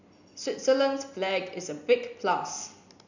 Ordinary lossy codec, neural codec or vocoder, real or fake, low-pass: none; none; real; 7.2 kHz